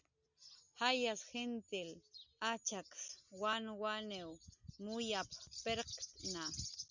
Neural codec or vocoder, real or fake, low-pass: none; real; 7.2 kHz